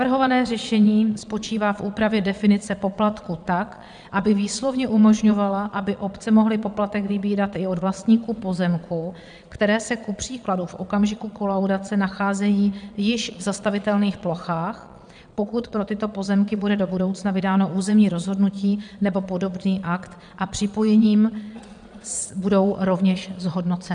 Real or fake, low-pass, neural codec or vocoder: fake; 9.9 kHz; vocoder, 22.05 kHz, 80 mel bands, Vocos